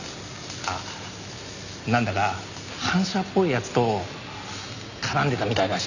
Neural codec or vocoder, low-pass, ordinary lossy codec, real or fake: none; 7.2 kHz; none; real